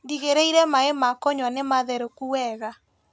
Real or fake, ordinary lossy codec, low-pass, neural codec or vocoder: real; none; none; none